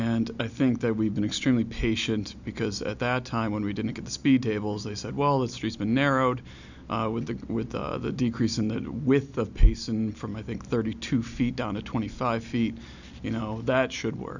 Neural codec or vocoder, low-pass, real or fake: none; 7.2 kHz; real